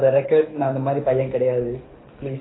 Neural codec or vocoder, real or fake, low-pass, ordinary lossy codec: codec, 24 kHz, 6 kbps, HILCodec; fake; 7.2 kHz; AAC, 16 kbps